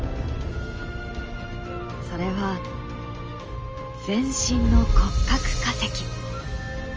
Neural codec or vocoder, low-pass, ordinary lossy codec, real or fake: none; 7.2 kHz; Opus, 24 kbps; real